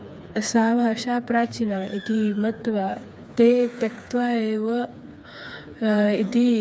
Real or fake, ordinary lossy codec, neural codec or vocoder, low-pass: fake; none; codec, 16 kHz, 4 kbps, FreqCodec, smaller model; none